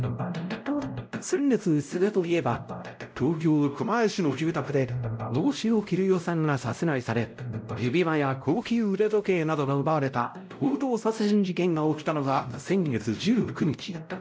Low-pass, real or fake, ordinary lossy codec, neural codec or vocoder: none; fake; none; codec, 16 kHz, 0.5 kbps, X-Codec, WavLM features, trained on Multilingual LibriSpeech